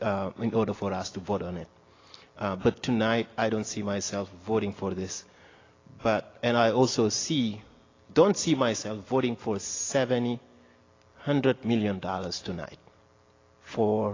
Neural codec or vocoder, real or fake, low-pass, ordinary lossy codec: none; real; 7.2 kHz; AAC, 32 kbps